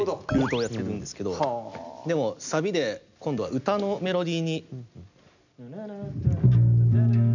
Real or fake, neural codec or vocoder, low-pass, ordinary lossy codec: real; none; 7.2 kHz; none